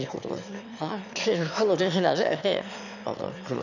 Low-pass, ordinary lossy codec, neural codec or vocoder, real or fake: 7.2 kHz; none; autoencoder, 22.05 kHz, a latent of 192 numbers a frame, VITS, trained on one speaker; fake